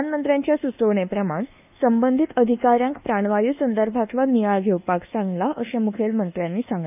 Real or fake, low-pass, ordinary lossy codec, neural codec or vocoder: fake; 3.6 kHz; none; codec, 24 kHz, 1.2 kbps, DualCodec